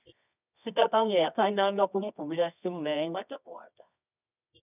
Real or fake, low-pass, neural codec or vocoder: fake; 3.6 kHz; codec, 24 kHz, 0.9 kbps, WavTokenizer, medium music audio release